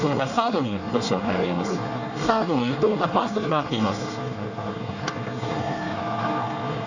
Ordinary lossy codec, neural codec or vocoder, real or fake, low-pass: none; codec, 24 kHz, 1 kbps, SNAC; fake; 7.2 kHz